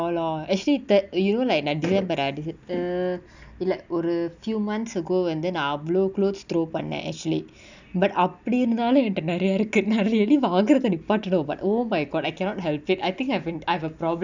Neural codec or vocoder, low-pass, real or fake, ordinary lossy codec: none; 7.2 kHz; real; none